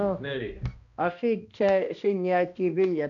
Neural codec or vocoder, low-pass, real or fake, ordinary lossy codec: codec, 16 kHz, 1 kbps, X-Codec, HuBERT features, trained on balanced general audio; 7.2 kHz; fake; none